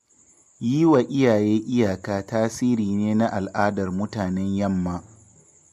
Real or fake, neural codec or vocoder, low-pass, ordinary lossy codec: real; none; 14.4 kHz; MP3, 64 kbps